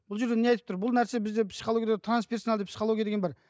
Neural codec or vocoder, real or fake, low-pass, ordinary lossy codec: none; real; none; none